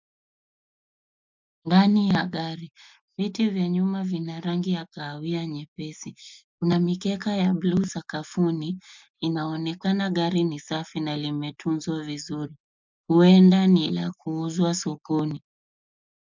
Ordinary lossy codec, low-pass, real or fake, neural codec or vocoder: MP3, 64 kbps; 7.2 kHz; real; none